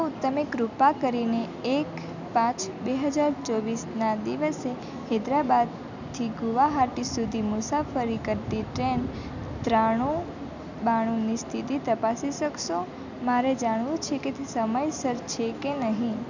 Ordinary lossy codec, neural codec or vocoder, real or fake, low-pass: none; none; real; 7.2 kHz